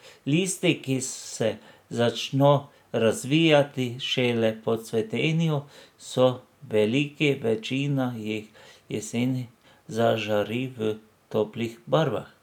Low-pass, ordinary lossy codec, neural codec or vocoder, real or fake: 19.8 kHz; none; none; real